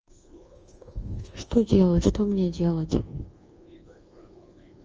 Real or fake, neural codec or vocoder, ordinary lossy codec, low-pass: fake; codec, 24 kHz, 1.2 kbps, DualCodec; Opus, 24 kbps; 7.2 kHz